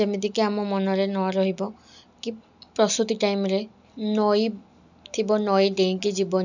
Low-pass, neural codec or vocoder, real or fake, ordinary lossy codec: 7.2 kHz; none; real; none